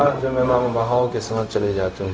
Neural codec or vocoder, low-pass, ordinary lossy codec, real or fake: codec, 16 kHz, 0.4 kbps, LongCat-Audio-Codec; none; none; fake